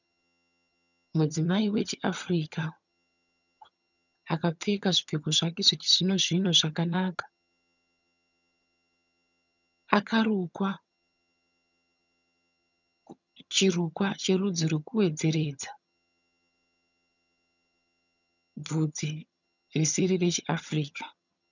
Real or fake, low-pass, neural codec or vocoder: fake; 7.2 kHz; vocoder, 22.05 kHz, 80 mel bands, HiFi-GAN